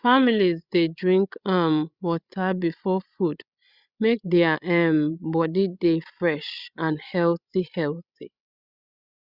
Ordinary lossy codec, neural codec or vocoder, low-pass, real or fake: Opus, 64 kbps; codec, 16 kHz, 16 kbps, FreqCodec, larger model; 5.4 kHz; fake